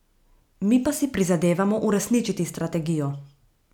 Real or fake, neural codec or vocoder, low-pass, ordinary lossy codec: real; none; 19.8 kHz; none